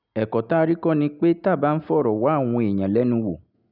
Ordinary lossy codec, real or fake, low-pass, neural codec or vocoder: none; real; 5.4 kHz; none